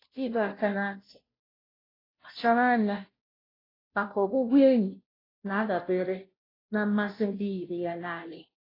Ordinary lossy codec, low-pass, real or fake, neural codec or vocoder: AAC, 24 kbps; 5.4 kHz; fake; codec, 16 kHz, 0.5 kbps, FunCodec, trained on Chinese and English, 25 frames a second